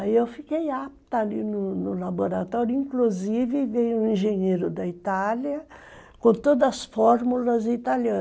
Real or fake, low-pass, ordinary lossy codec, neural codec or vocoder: real; none; none; none